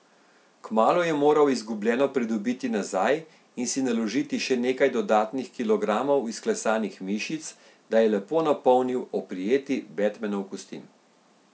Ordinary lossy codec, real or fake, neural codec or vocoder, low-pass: none; real; none; none